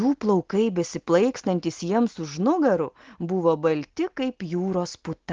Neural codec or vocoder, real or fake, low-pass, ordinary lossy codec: none; real; 7.2 kHz; Opus, 16 kbps